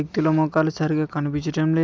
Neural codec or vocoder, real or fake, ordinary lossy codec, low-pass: none; real; none; none